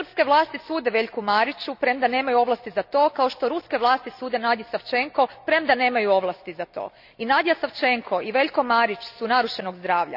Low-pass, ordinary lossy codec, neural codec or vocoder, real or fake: 5.4 kHz; none; none; real